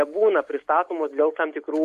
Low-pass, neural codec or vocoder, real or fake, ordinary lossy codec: 14.4 kHz; none; real; MP3, 64 kbps